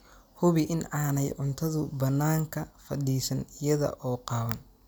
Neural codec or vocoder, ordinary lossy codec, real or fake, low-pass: none; none; real; none